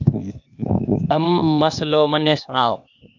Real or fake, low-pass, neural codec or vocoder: fake; 7.2 kHz; codec, 16 kHz, 0.8 kbps, ZipCodec